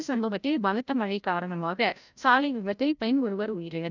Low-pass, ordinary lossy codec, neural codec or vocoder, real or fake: 7.2 kHz; none; codec, 16 kHz, 0.5 kbps, FreqCodec, larger model; fake